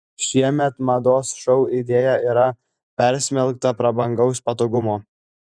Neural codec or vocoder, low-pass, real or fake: vocoder, 24 kHz, 100 mel bands, Vocos; 9.9 kHz; fake